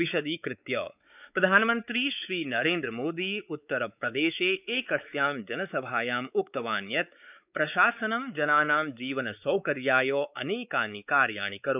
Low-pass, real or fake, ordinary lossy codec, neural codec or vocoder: 3.6 kHz; fake; none; codec, 16 kHz, 4 kbps, X-Codec, WavLM features, trained on Multilingual LibriSpeech